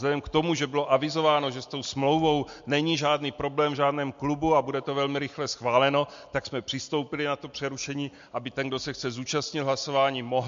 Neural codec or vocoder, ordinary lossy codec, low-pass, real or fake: none; MP3, 48 kbps; 7.2 kHz; real